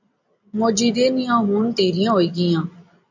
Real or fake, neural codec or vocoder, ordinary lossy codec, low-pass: real; none; AAC, 48 kbps; 7.2 kHz